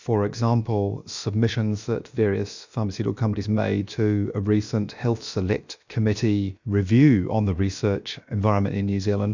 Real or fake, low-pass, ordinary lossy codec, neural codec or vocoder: fake; 7.2 kHz; Opus, 64 kbps; codec, 16 kHz, about 1 kbps, DyCAST, with the encoder's durations